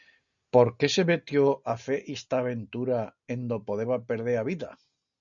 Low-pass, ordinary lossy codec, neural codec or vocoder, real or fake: 7.2 kHz; MP3, 96 kbps; none; real